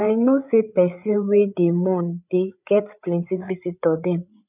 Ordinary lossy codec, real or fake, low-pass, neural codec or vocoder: none; fake; 3.6 kHz; codec, 16 kHz, 8 kbps, FreqCodec, larger model